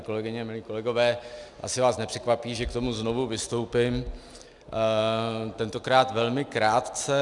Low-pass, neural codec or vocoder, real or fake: 10.8 kHz; none; real